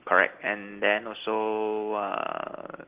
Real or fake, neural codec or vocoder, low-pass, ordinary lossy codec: real; none; 3.6 kHz; Opus, 16 kbps